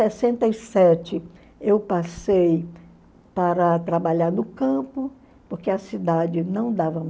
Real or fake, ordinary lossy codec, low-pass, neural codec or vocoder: real; none; none; none